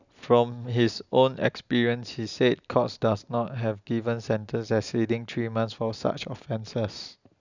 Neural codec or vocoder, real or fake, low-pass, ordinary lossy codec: none; real; 7.2 kHz; none